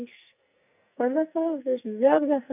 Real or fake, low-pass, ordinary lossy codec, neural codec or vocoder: fake; 3.6 kHz; none; codec, 44.1 kHz, 2.6 kbps, SNAC